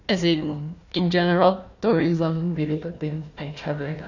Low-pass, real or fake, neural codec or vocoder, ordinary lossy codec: 7.2 kHz; fake; codec, 16 kHz, 1 kbps, FunCodec, trained on Chinese and English, 50 frames a second; none